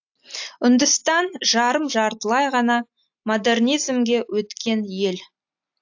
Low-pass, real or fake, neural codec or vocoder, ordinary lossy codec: 7.2 kHz; real; none; AAC, 48 kbps